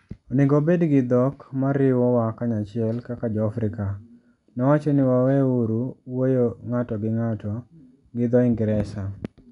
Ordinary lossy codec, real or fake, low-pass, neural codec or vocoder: none; real; 10.8 kHz; none